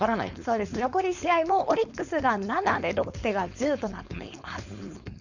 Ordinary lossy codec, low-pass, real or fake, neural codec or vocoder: none; 7.2 kHz; fake; codec, 16 kHz, 4.8 kbps, FACodec